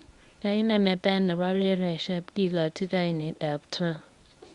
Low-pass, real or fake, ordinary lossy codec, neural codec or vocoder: 10.8 kHz; fake; Opus, 64 kbps; codec, 24 kHz, 0.9 kbps, WavTokenizer, medium speech release version 1